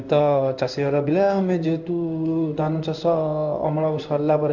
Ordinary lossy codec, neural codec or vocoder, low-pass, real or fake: none; codec, 16 kHz in and 24 kHz out, 1 kbps, XY-Tokenizer; 7.2 kHz; fake